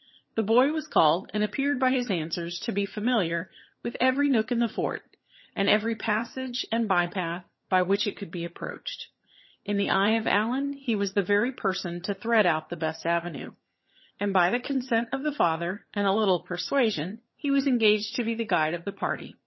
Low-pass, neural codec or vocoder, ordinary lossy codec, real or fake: 7.2 kHz; vocoder, 22.05 kHz, 80 mel bands, HiFi-GAN; MP3, 24 kbps; fake